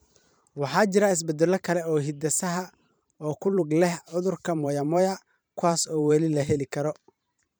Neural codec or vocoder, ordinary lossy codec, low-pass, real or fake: vocoder, 44.1 kHz, 128 mel bands every 512 samples, BigVGAN v2; none; none; fake